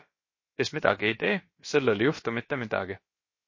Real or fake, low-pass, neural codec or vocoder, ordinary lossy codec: fake; 7.2 kHz; codec, 16 kHz, about 1 kbps, DyCAST, with the encoder's durations; MP3, 32 kbps